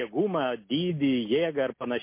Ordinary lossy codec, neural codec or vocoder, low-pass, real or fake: MP3, 24 kbps; none; 3.6 kHz; real